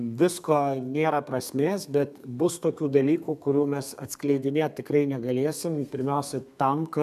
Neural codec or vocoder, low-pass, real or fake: codec, 32 kHz, 1.9 kbps, SNAC; 14.4 kHz; fake